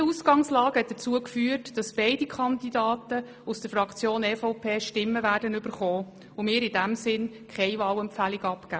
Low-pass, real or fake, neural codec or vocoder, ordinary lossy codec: none; real; none; none